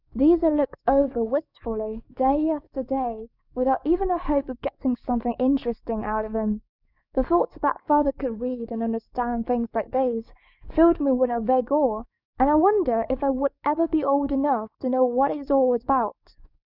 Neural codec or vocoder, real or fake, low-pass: codec, 44.1 kHz, 7.8 kbps, DAC; fake; 5.4 kHz